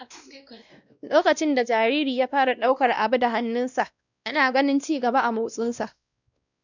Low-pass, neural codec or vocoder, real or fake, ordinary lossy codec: 7.2 kHz; codec, 16 kHz, 1 kbps, X-Codec, WavLM features, trained on Multilingual LibriSpeech; fake; none